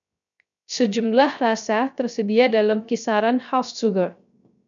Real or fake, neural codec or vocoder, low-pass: fake; codec, 16 kHz, 0.3 kbps, FocalCodec; 7.2 kHz